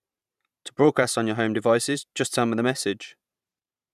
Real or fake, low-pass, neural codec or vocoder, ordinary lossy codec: fake; 14.4 kHz; vocoder, 44.1 kHz, 128 mel bands every 512 samples, BigVGAN v2; none